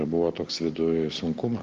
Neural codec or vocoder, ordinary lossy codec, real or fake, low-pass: none; Opus, 16 kbps; real; 7.2 kHz